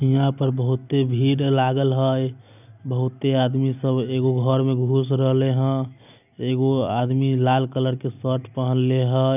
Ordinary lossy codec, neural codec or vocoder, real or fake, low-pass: none; none; real; 3.6 kHz